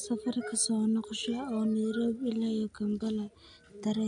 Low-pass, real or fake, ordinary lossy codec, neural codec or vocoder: 9.9 kHz; real; none; none